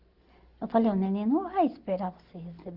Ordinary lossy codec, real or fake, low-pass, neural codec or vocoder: Opus, 16 kbps; real; 5.4 kHz; none